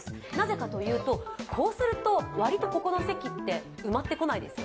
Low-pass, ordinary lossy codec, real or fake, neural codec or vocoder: none; none; real; none